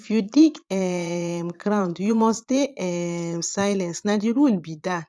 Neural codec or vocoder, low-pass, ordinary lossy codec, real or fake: vocoder, 22.05 kHz, 80 mel bands, Vocos; none; none; fake